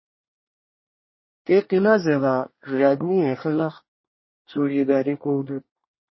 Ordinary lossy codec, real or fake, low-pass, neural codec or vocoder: MP3, 24 kbps; fake; 7.2 kHz; codec, 24 kHz, 1 kbps, SNAC